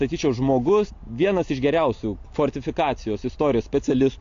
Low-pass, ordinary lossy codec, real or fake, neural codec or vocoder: 7.2 kHz; AAC, 48 kbps; real; none